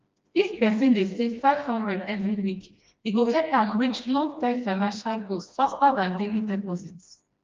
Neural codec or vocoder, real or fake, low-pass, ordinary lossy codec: codec, 16 kHz, 1 kbps, FreqCodec, smaller model; fake; 7.2 kHz; Opus, 24 kbps